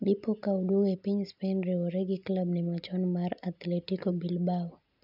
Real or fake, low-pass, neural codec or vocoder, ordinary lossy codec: real; 5.4 kHz; none; none